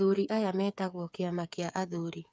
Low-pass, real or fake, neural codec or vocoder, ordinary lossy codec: none; fake; codec, 16 kHz, 8 kbps, FreqCodec, smaller model; none